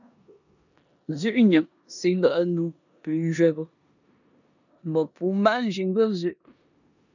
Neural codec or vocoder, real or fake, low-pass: codec, 16 kHz in and 24 kHz out, 0.9 kbps, LongCat-Audio-Codec, four codebook decoder; fake; 7.2 kHz